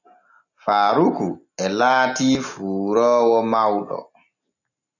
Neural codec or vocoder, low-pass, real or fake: none; 7.2 kHz; real